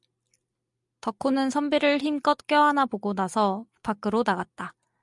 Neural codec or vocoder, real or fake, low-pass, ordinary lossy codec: none; real; 10.8 kHz; MP3, 96 kbps